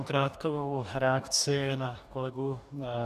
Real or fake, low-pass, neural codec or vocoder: fake; 14.4 kHz; codec, 44.1 kHz, 2.6 kbps, DAC